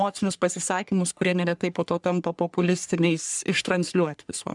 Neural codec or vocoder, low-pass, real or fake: codec, 44.1 kHz, 3.4 kbps, Pupu-Codec; 10.8 kHz; fake